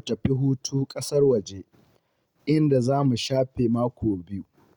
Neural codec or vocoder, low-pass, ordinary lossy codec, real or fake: none; 19.8 kHz; Opus, 64 kbps; real